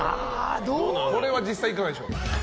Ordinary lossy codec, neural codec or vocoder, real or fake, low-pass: none; none; real; none